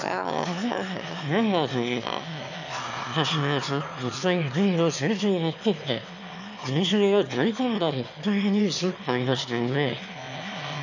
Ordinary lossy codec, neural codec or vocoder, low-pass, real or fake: none; autoencoder, 22.05 kHz, a latent of 192 numbers a frame, VITS, trained on one speaker; 7.2 kHz; fake